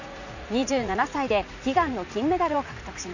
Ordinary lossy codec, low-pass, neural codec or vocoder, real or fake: none; 7.2 kHz; vocoder, 44.1 kHz, 80 mel bands, Vocos; fake